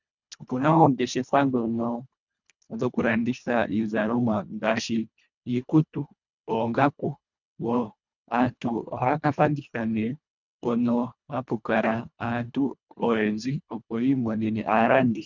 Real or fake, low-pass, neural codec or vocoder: fake; 7.2 kHz; codec, 24 kHz, 1.5 kbps, HILCodec